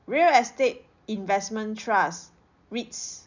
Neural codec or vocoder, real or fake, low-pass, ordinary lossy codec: none; real; 7.2 kHz; none